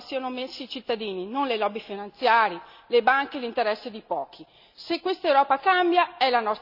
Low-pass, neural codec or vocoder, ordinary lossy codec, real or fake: 5.4 kHz; none; none; real